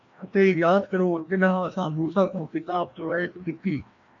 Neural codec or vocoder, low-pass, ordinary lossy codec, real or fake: codec, 16 kHz, 1 kbps, FreqCodec, larger model; 7.2 kHz; AAC, 48 kbps; fake